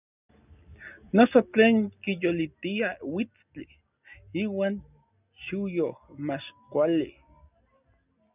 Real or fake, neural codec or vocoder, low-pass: real; none; 3.6 kHz